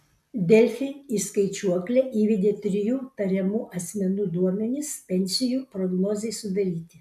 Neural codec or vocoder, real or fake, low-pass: none; real; 14.4 kHz